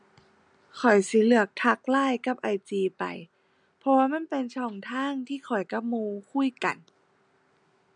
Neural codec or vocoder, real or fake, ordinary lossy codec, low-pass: none; real; none; 9.9 kHz